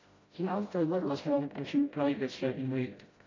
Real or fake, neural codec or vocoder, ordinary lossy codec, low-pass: fake; codec, 16 kHz, 0.5 kbps, FreqCodec, smaller model; AAC, 32 kbps; 7.2 kHz